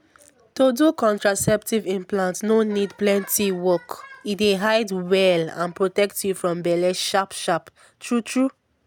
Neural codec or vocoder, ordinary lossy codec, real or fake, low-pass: none; none; real; none